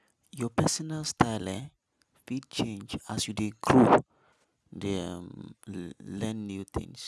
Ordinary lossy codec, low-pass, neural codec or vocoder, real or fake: none; none; none; real